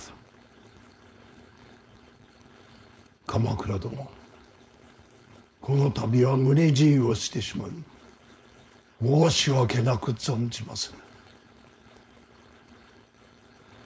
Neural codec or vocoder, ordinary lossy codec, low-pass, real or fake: codec, 16 kHz, 4.8 kbps, FACodec; none; none; fake